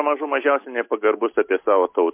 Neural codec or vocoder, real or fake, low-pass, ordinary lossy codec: none; real; 3.6 kHz; MP3, 32 kbps